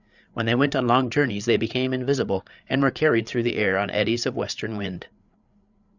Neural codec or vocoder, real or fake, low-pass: codec, 16 kHz, 8 kbps, FreqCodec, larger model; fake; 7.2 kHz